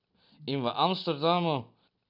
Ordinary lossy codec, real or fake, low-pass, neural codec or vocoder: none; real; 5.4 kHz; none